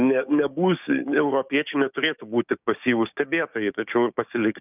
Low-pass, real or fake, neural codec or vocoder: 3.6 kHz; fake; codec, 16 kHz, 6 kbps, DAC